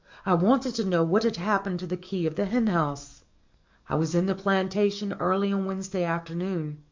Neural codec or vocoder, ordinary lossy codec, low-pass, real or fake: codec, 44.1 kHz, 7.8 kbps, DAC; MP3, 64 kbps; 7.2 kHz; fake